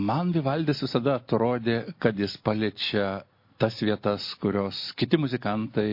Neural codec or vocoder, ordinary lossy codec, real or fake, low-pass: none; MP3, 32 kbps; real; 5.4 kHz